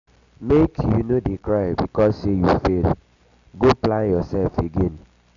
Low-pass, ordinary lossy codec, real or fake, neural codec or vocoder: 7.2 kHz; none; real; none